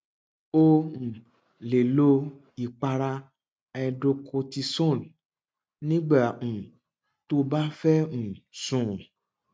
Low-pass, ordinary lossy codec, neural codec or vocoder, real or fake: none; none; none; real